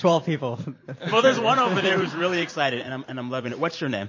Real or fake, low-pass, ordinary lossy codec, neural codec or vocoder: fake; 7.2 kHz; MP3, 32 kbps; vocoder, 44.1 kHz, 128 mel bands every 512 samples, BigVGAN v2